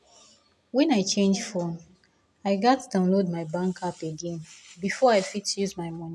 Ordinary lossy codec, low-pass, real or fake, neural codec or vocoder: none; none; real; none